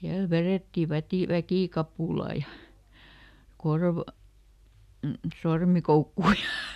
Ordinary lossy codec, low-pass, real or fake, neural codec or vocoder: none; 14.4 kHz; real; none